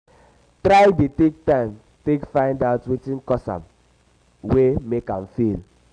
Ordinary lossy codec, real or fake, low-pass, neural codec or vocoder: Opus, 64 kbps; real; 9.9 kHz; none